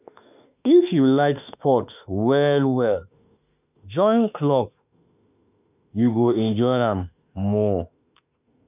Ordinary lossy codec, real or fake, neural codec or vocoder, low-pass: none; fake; autoencoder, 48 kHz, 32 numbers a frame, DAC-VAE, trained on Japanese speech; 3.6 kHz